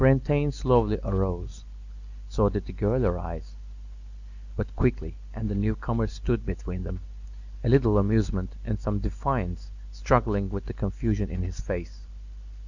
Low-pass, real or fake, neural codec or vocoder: 7.2 kHz; real; none